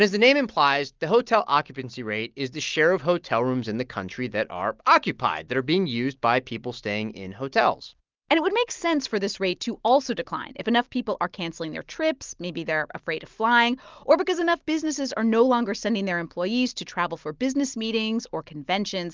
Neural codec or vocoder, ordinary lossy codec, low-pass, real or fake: none; Opus, 32 kbps; 7.2 kHz; real